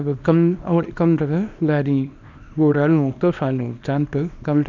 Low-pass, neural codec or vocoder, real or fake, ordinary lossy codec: 7.2 kHz; codec, 24 kHz, 0.9 kbps, WavTokenizer, small release; fake; none